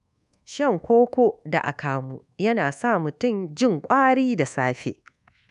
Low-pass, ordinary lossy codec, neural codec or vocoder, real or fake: 10.8 kHz; none; codec, 24 kHz, 1.2 kbps, DualCodec; fake